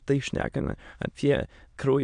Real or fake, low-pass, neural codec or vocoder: fake; 9.9 kHz; autoencoder, 22.05 kHz, a latent of 192 numbers a frame, VITS, trained on many speakers